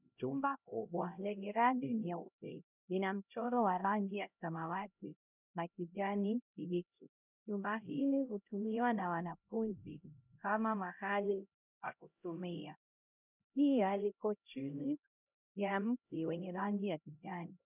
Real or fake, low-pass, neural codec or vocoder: fake; 3.6 kHz; codec, 16 kHz, 0.5 kbps, X-Codec, HuBERT features, trained on LibriSpeech